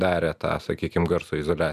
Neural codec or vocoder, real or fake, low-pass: none; real; 14.4 kHz